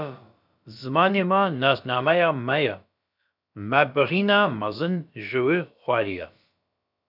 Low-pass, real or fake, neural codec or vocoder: 5.4 kHz; fake; codec, 16 kHz, about 1 kbps, DyCAST, with the encoder's durations